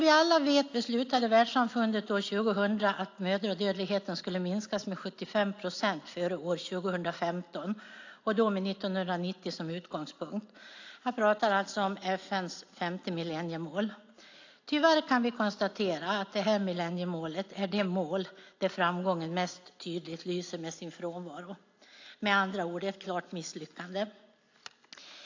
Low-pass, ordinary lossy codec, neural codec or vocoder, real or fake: 7.2 kHz; AAC, 48 kbps; none; real